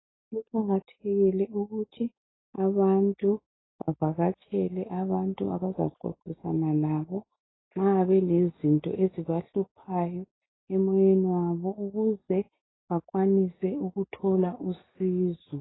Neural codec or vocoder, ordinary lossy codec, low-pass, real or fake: none; AAC, 16 kbps; 7.2 kHz; real